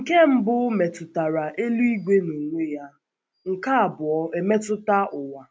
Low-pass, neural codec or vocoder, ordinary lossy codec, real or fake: none; none; none; real